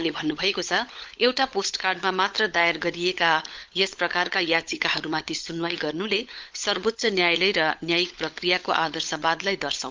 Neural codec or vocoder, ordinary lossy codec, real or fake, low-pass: codec, 16 kHz, 16 kbps, FunCodec, trained on LibriTTS, 50 frames a second; Opus, 24 kbps; fake; 7.2 kHz